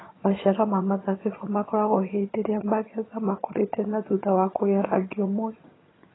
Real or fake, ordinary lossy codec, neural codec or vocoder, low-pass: fake; AAC, 16 kbps; vocoder, 22.05 kHz, 80 mel bands, HiFi-GAN; 7.2 kHz